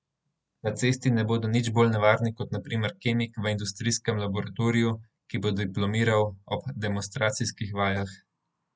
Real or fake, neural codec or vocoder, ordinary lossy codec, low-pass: real; none; none; none